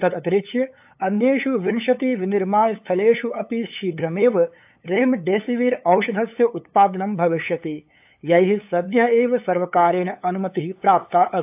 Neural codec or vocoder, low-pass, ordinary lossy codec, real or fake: codec, 16 kHz, 8 kbps, FunCodec, trained on LibriTTS, 25 frames a second; 3.6 kHz; AAC, 32 kbps; fake